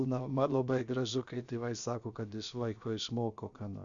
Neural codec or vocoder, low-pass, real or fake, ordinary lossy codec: codec, 16 kHz, about 1 kbps, DyCAST, with the encoder's durations; 7.2 kHz; fake; Opus, 64 kbps